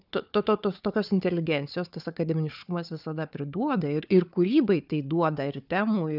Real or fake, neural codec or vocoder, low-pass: fake; codec, 16 kHz, 4 kbps, FunCodec, trained on Chinese and English, 50 frames a second; 5.4 kHz